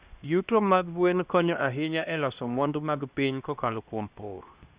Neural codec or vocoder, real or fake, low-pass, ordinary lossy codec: codec, 16 kHz, 1 kbps, X-Codec, HuBERT features, trained on LibriSpeech; fake; 3.6 kHz; Opus, 64 kbps